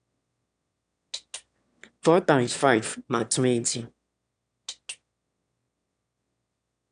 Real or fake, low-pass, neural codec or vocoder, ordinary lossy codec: fake; 9.9 kHz; autoencoder, 22.05 kHz, a latent of 192 numbers a frame, VITS, trained on one speaker; none